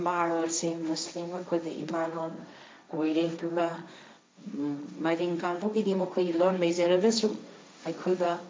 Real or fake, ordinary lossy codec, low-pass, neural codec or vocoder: fake; none; none; codec, 16 kHz, 1.1 kbps, Voila-Tokenizer